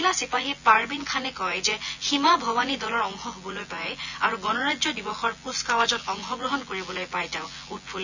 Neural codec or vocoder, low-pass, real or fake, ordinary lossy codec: vocoder, 24 kHz, 100 mel bands, Vocos; 7.2 kHz; fake; none